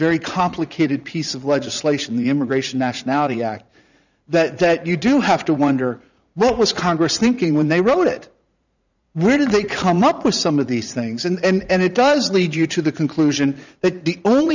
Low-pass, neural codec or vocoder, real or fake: 7.2 kHz; vocoder, 44.1 kHz, 80 mel bands, Vocos; fake